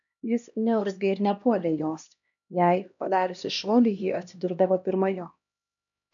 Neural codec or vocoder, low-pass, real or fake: codec, 16 kHz, 1 kbps, X-Codec, HuBERT features, trained on LibriSpeech; 7.2 kHz; fake